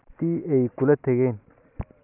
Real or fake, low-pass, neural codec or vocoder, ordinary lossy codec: real; 3.6 kHz; none; none